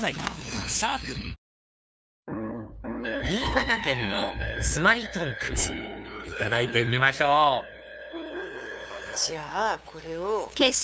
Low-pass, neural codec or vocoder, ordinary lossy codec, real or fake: none; codec, 16 kHz, 2 kbps, FunCodec, trained on LibriTTS, 25 frames a second; none; fake